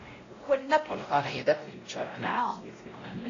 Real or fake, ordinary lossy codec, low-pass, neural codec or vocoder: fake; AAC, 32 kbps; 7.2 kHz; codec, 16 kHz, 0.5 kbps, X-Codec, WavLM features, trained on Multilingual LibriSpeech